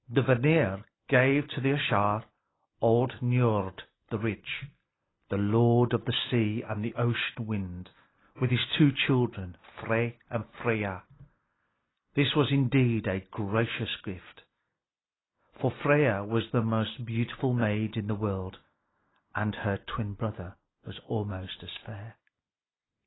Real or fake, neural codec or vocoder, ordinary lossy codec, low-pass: real; none; AAC, 16 kbps; 7.2 kHz